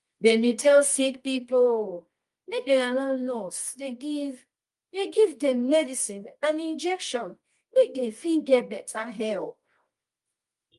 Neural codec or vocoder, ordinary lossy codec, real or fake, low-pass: codec, 24 kHz, 0.9 kbps, WavTokenizer, medium music audio release; Opus, 32 kbps; fake; 10.8 kHz